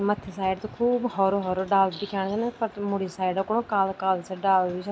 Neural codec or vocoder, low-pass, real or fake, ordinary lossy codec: none; none; real; none